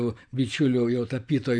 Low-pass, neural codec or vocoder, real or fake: 9.9 kHz; vocoder, 44.1 kHz, 128 mel bands every 512 samples, BigVGAN v2; fake